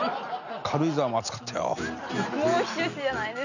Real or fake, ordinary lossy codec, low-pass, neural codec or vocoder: real; none; 7.2 kHz; none